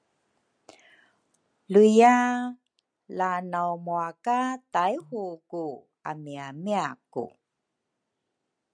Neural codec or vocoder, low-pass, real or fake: none; 9.9 kHz; real